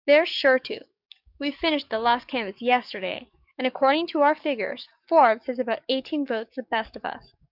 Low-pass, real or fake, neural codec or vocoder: 5.4 kHz; fake; codec, 44.1 kHz, 7.8 kbps, DAC